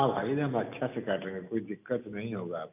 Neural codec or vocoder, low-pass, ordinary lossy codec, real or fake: none; 3.6 kHz; none; real